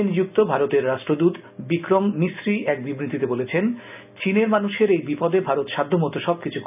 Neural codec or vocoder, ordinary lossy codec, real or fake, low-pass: none; none; real; 3.6 kHz